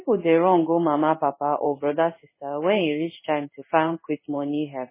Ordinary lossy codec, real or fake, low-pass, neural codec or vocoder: MP3, 16 kbps; fake; 3.6 kHz; codec, 16 kHz in and 24 kHz out, 1 kbps, XY-Tokenizer